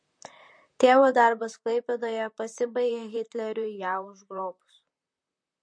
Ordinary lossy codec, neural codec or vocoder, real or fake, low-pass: MP3, 48 kbps; vocoder, 44.1 kHz, 128 mel bands, Pupu-Vocoder; fake; 9.9 kHz